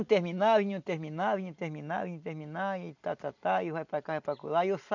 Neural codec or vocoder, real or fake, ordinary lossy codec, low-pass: none; real; none; 7.2 kHz